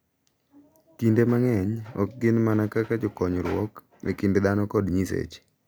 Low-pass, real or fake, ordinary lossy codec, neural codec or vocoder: none; real; none; none